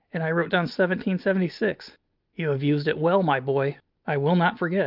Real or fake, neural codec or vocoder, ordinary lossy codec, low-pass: real; none; Opus, 24 kbps; 5.4 kHz